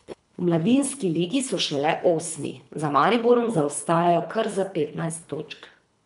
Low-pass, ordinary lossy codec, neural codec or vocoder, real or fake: 10.8 kHz; MP3, 96 kbps; codec, 24 kHz, 3 kbps, HILCodec; fake